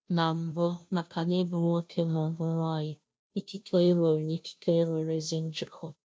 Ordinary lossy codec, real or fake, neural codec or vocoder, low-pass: none; fake; codec, 16 kHz, 0.5 kbps, FunCodec, trained on Chinese and English, 25 frames a second; none